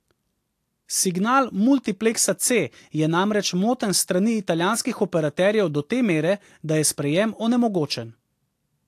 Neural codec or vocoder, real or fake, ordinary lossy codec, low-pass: none; real; AAC, 64 kbps; 14.4 kHz